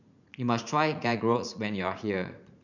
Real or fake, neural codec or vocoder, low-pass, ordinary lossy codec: fake; vocoder, 44.1 kHz, 80 mel bands, Vocos; 7.2 kHz; none